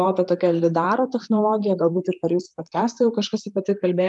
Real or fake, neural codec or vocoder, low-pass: fake; vocoder, 44.1 kHz, 128 mel bands, Pupu-Vocoder; 10.8 kHz